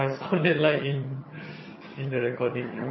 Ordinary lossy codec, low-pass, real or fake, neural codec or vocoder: MP3, 24 kbps; 7.2 kHz; fake; vocoder, 22.05 kHz, 80 mel bands, HiFi-GAN